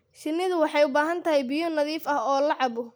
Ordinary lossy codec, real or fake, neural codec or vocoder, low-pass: none; real; none; none